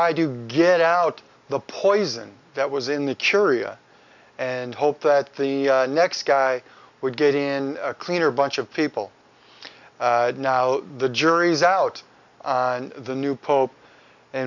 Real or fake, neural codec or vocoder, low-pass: real; none; 7.2 kHz